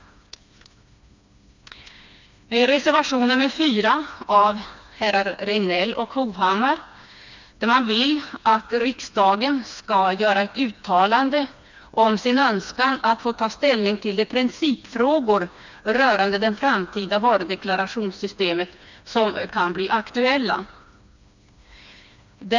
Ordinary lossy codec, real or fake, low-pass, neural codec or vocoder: MP3, 64 kbps; fake; 7.2 kHz; codec, 16 kHz, 2 kbps, FreqCodec, smaller model